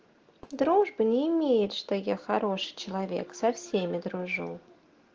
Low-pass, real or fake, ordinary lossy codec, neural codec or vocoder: 7.2 kHz; real; Opus, 16 kbps; none